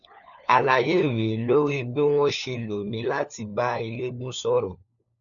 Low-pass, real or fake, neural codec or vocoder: 7.2 kHz; fake; codec, 16 kHz, 4 kbps, FunCodec, trained on LibriTTS, 50 frames a second